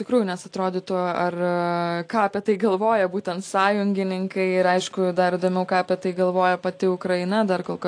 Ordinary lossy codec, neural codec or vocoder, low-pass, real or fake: AAC, 48 kbps; none; 9.9 kHz; real